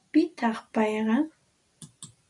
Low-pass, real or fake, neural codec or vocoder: 10.8 kHz; real; none